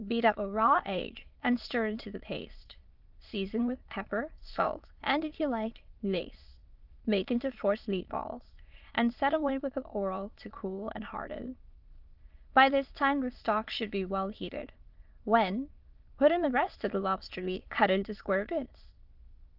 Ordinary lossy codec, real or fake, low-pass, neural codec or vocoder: Opus, 32 kbps; fake; 5.4 kHz; autoencoder, 22.05 kHz, a latent of 192 numbers a frame, VITS, trained on many speakers